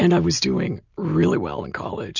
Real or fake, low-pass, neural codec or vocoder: real; 7.2 kHz; none